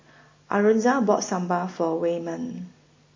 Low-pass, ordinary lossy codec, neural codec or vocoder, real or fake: 7.2 kHz; MP3, 32 kbps; none; real